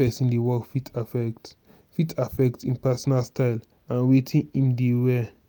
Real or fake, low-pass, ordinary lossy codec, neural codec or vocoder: real; none; none; none